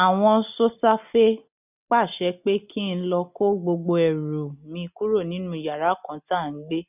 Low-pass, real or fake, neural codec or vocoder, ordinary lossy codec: 3.6 kHz; real; none; none